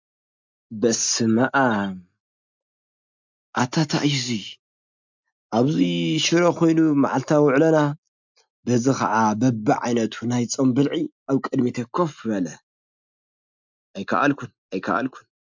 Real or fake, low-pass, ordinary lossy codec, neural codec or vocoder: real; 7.2 kHz; MP3, 64 kbps; none